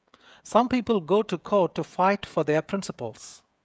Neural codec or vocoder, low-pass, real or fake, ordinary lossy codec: codec, 16 kHz, 16 kbps, FreqCodec, smaller model; none; fake; none